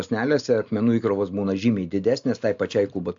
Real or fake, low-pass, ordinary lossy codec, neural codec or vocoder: real; 7.2 kHz; AAC, 64 kbps; none